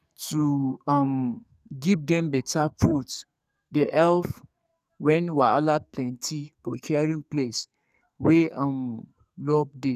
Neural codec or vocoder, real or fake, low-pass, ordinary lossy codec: codec, 44.1 kHz, 2.6 kbps, SNAC; fake; 14.4 kHz; none